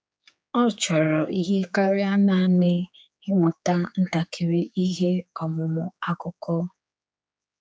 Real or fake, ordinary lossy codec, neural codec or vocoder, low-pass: fake; none; codec, 16 kHz, 4 kbps, X-Codec, HuBERT features, trained on general audio; none